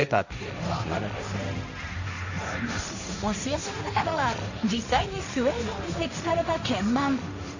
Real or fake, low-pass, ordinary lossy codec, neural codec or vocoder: fake; 7.2 kHz; none; codec, 16 kHz, 1.1 kbps, Voila-Tokenizer